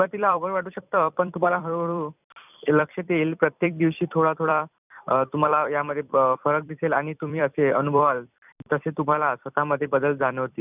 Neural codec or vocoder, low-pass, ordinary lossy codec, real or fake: vocoder, 44.1 kHz, 128 mel bands every 256 samples, BigVGAN v2; 3.6 kHz; none; fake